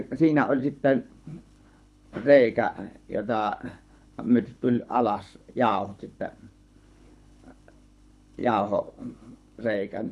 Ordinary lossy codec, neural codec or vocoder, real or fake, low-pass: none; codec, 24 kHz, 6 kbps, HILCodec; fake; none